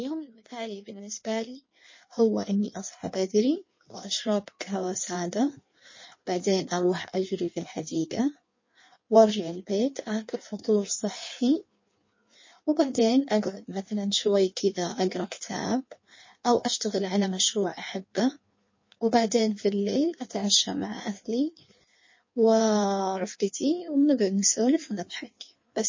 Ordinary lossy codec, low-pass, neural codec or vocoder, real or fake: MP3, 32 kbps; 7.2 kHz; codec, 16 kHz in and 24 kHz out, 1.1 kbps, FireRedTTS-2 codec; fake